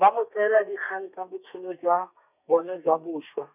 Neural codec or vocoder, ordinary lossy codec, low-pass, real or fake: codec, 32 kHz, 1.9 kbps, SNAC; none; 3.6 kHz; fake